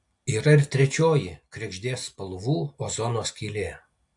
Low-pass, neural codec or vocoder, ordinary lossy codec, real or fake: 10.8 kHz; none; AAC, 64 kbps; real